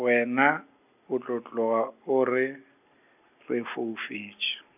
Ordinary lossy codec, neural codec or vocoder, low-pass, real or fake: none; none; 3.6 kHz; real